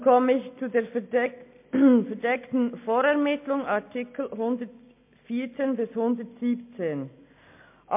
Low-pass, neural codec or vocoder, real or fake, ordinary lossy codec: 3.6 kHz; none; real; MP3, 24 kbps